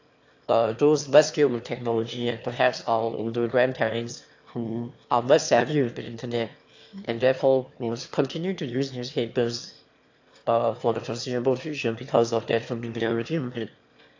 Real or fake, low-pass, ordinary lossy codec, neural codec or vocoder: fake; 7.2 kHz; AAC, 48 kbps; autoencoder, 22.05 kHz, a latent of 192 numbers a frame, VITS, trained on one speaker